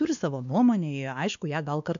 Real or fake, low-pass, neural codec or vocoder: fake; 7.2 kHz; codec, 16 kHz, 2 kbps, X-Codec, WavLM features, trained on Multilingual LibriSpeech